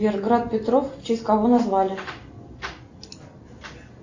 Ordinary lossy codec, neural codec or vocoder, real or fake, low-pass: AAC, 48 kbps; none; real; 7.2 kHz